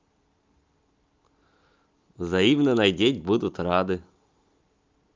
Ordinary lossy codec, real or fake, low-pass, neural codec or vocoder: Opus, 32 kbps; real; 7.2 kHz; none